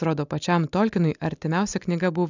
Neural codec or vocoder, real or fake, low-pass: none; real; 7.2 kHz